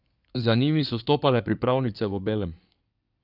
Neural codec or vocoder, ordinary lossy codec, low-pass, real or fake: codec, 16 kHz in and 24 kHz out, 2.2 kbps, FireRedTTS-2 codec; none; 5.4 kHz; fake